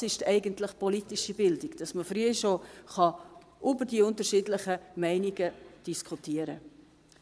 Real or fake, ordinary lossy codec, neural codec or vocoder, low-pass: fake; none; vocoder, 22.05 kHz, 80 mel bands, Vocos; none